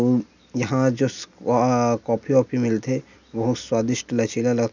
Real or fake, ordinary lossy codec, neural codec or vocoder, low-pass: real; none; none; 7.2 kHz